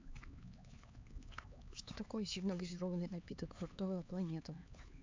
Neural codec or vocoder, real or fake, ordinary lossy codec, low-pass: codec, 16 kHz, 4 kbps, X-Codec, HuBERT features, trained on LibriSpeech; fake; MP3, 64 kbps; 7.2 kHz